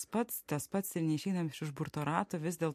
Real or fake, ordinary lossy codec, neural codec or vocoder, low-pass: real; MP3, 64 kbps; none; 14.4 kHz